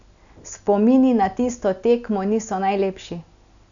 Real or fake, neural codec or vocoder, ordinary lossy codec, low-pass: real; none; none; 7.2 kHz